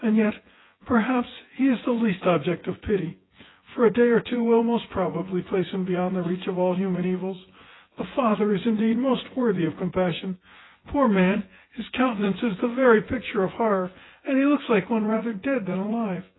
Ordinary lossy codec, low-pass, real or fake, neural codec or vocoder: AAC, 16 kbps; 7.2 kHz; fake; vocoder, 24 kHz, 100 mel bands, Vocos